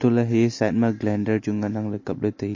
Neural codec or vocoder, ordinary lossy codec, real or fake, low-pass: none; MP3, 32 kbps; real; 7.2 kHz